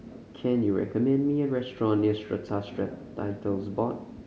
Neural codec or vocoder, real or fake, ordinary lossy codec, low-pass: none; real; none; none